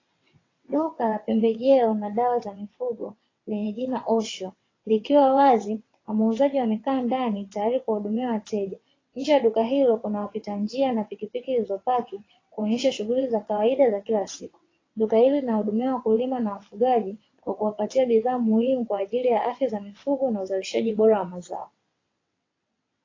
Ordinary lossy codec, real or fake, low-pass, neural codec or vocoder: AAC, 32 kbps; fake; 7.2 kHz; vocoder, 22.05 kHz, 80 mel bands, WaveNeXt